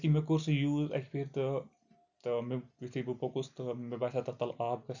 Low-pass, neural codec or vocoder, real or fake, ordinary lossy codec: 7.2 kHz; none; real; none